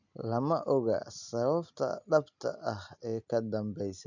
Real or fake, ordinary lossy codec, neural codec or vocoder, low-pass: real; none; none; 7.2 kHz